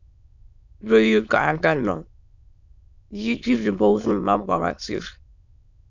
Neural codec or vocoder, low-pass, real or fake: autoencoder, 22.05 kHz, a latent of 192 numbers a frame, VITS, trained on many speakers; 7.2 kHz; fake